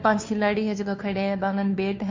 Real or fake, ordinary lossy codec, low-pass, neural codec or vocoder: fake; MP3, 48 kbps; 7.2 kHz; codec, 16 kHz, 2 kbps, FunCodec, trained on Chinese and English, 25 frames a second